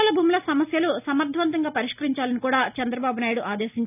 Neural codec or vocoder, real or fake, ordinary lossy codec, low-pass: none; real; none; 3.6 kHz